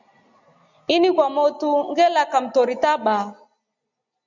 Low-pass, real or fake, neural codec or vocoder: 7.2 kHz; real; none